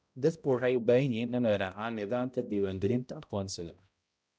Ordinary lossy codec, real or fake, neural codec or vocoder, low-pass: none; fake; codec, 16 kHz, 0.5 kbps, X-Codec, HuBERT features, trained on balanced general audio; none